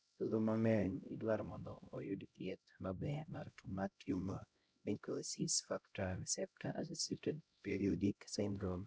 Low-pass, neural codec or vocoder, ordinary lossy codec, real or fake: none; codec, 16 kHz, 0.5 kbps, X-Codec, HuBERT features, trained on LibriSpeech; none; fake